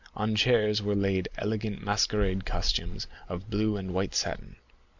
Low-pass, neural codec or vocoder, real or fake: 7.2 kHz; none; real